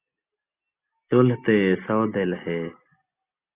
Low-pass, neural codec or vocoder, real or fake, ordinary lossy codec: 3.6 kHz; none; real; Opus, 64 kbps